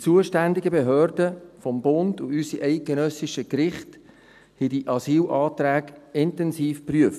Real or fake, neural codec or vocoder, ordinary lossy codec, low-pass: real; none; none; 14.4 kHz